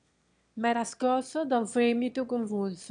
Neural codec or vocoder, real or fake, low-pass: autoencoder, 22.05 kHz, a latent of 192 numbers a frame, VITS, trained on one speaker; fake; 9.9 kHz